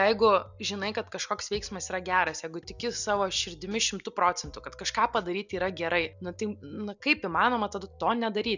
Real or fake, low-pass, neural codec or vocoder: real; 7.2 kHz; none